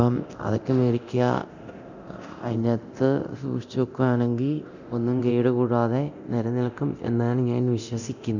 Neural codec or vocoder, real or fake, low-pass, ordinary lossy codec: codec, 24 kHz, 0.9 kbps, DualCodec; fake; 7.2 kHz; none